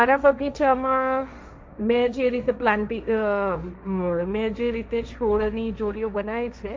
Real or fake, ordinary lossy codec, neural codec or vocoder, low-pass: fake; none; codec, 16 kHz, 1.1 kbps, Voila-Tokenizer; none